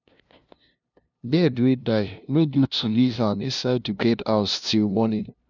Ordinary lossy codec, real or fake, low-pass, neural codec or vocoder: none; fake; 7.2 kHz; codec, 16 kHz, 0.5 kbps, FunCodec, trained on LibriTTS, 25 frames a second